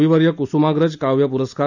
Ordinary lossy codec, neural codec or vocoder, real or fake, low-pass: none; none; real; 7.2 kHz